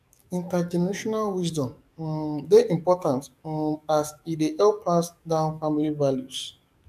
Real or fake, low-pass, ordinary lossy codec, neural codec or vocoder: fake; 14.4 kHz; none; codec, 44.1 kHz, 7.8 kbps, DAC